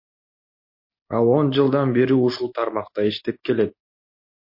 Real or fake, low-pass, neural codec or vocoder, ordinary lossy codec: real; 5.4 kHz; none; MP3, 32 kbps